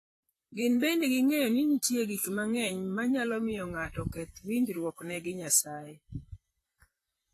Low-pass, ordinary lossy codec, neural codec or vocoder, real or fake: 14.4 kHz; AAC, 48 kbps; vocoder, 44.1 kHz, 128 mel bands, Pupu-Vocoder; fake